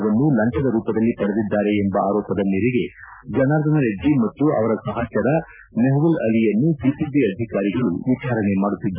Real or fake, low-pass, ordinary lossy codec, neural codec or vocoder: real; 3.6 kHz; none; none